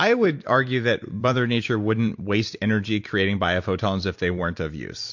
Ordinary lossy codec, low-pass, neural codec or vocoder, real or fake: MP3, 48 kbps; 7.2 kHz; vocoder, 44.1 kHz, 128 mel bands every 256 samples, BigVGAN v2; fake